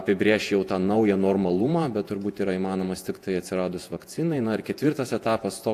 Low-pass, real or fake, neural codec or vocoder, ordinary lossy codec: 14.4 kHz; fake; vocoder, 48 kHz, 128 mel bands, Vocos; AAC, 64 kbps